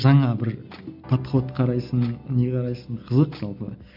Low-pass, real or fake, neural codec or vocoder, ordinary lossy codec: 5.4 kHz; real; none; MP3, 48 kbps